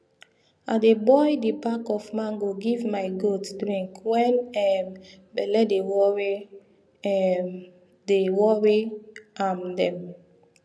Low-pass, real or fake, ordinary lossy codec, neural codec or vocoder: none; real; none; none